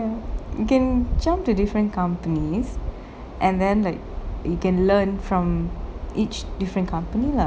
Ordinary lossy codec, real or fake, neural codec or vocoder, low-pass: none; real; none; none